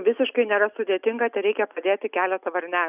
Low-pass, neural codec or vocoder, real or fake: 3.6 kHz; none; real